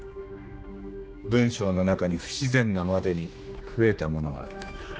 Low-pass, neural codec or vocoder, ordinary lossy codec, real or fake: none; codec, 16 kHz, 2 kbps, X-Codec, HuBERT features, trained on general audio; none; fake